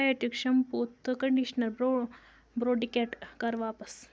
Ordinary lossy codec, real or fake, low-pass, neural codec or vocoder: none; real; none; none